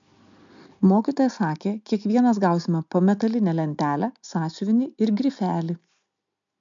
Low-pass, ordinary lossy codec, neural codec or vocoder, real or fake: 7.2 kHz; MP3, 64 kbps; none; real